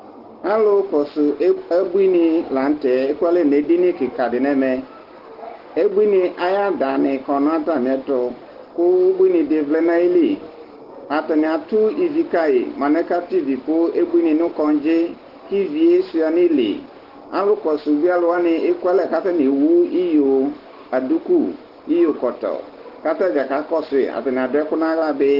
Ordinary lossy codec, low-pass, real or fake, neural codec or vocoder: Opus, 16 kbps; 5.4 kHz; real; none